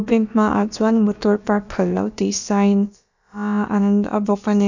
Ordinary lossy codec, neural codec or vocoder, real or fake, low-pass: none; codec, 16 kHz, about 1 kbps, DyCAST, with the encoder's durations; fake; 7.2 kHz